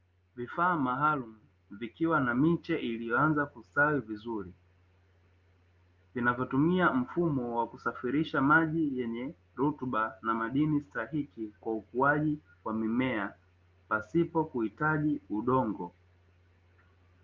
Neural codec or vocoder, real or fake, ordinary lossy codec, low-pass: none; real; Opus, 24 kbps; 7.2 kHz